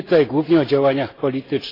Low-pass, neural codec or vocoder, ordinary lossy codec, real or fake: 5.4 kHz; vocoder, 44.1 kHz, 128 mel bands every 256 samples, BigVGAN v2; AAC, 24 kbps; fake